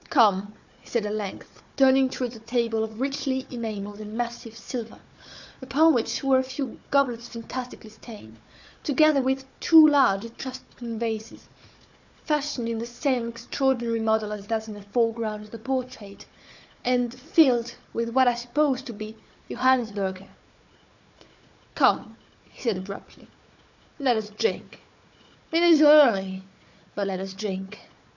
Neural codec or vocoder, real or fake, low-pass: codec, 16 kHz, 4 kbps, FunCodec, trained on Chinese and English, 50 frames a second; fake; 7.2 kHz